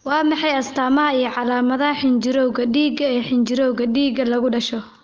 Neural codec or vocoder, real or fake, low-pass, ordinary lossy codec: none; real; 7.2 kHz; Opus, 32 kbps